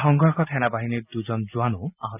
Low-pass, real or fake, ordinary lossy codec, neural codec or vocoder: 3.6 kHz; real; none; none